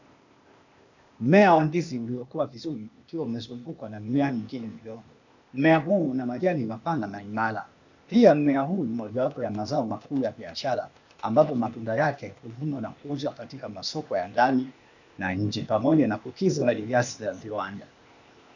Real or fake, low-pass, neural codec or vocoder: fake; 7.2 kHz; codec, 16 kHz, 0.8 kbps, ZipCodec